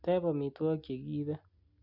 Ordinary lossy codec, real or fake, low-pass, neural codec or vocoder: none; real; 5.4 kHz; none